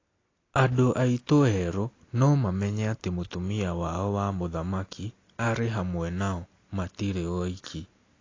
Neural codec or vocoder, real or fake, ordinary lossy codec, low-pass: none; real; AAC, 32 kbps; 7.2 kHz